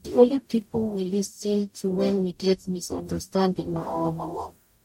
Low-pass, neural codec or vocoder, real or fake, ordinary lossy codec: 19.8 kHz; codec, 44.1 kHz, 0.9 kbps, DAC; fake; MP3, 64 kbps